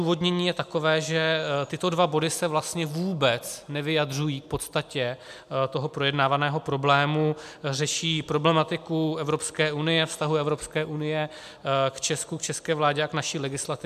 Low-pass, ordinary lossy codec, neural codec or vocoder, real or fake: 14.4 kHz; MP3, 96 kbps; none; real